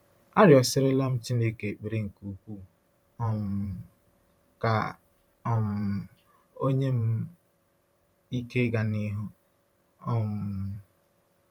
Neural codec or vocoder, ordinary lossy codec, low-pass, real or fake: vocoder, 44.1 kHz, 128 mel bands every 512 samples, BigVGAN v2; none; 19.8 kHz; fake